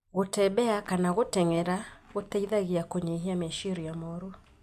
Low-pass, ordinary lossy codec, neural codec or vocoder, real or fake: 14.4 kHz; none; none; real